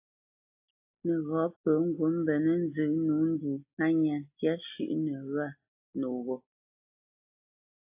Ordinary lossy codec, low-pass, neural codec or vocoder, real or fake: AAC, 32 kbps; 3.6 kHz; none; real